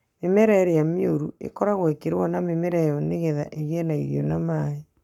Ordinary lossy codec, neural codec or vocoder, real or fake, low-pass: none; codec, 44.1 kHz, 7.8 kbps, Pupu-Codec; fake; 19.8 kHz